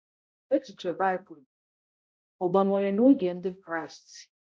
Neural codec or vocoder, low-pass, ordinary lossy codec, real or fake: codec, 16 kHz, 0.5 kbps, X-Codec, HuBERT features, trained on balanced general audio; none; none; fake